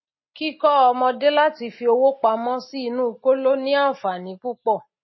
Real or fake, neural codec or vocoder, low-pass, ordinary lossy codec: real; none; 7.2 kHz; MP3, 24 kbps